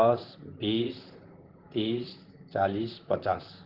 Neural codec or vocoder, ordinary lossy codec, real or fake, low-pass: vocoder, 44.1 kHz, 128 mel bands, Pupu-Vocoder; Opus, 16 kbps; fake; 5.4 kHz